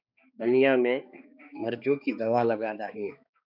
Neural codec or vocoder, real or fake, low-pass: codec, 16 kHz, 2 kbps, X-Codec, HuBERT features, trained on balanced general audio; fake; 5.4 kHz